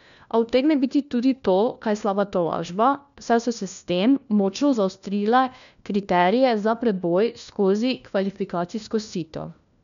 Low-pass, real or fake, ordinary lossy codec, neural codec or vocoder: 7.2 kHz; fake; none; codec, 16 kHz, 1 kbps, FunCodec, trained on LibriTTS, 50 frames a second